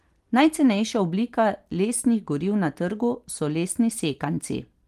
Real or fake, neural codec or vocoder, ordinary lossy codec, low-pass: real; none; Opus, 24 kbps; 14.4 kHz